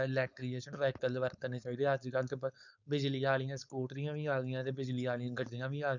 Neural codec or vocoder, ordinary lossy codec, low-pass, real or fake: codec, 16 kHz, 4.8 kbps, FACodec; none; 7.2 kHz; fake